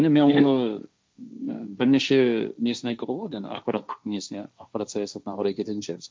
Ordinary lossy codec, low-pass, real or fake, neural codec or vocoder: none; none; fake; codec, 16 kHz, 1.1 kbps, Voila-Tokenizer